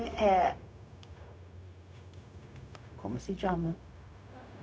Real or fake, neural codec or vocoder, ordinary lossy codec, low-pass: fake; codec, 16 kHz, 0.4 kbps, LongCat-Audio-Codec; none; none